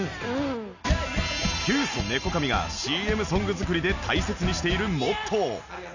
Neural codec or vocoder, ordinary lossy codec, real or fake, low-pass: none; none; real; 7.2 kHz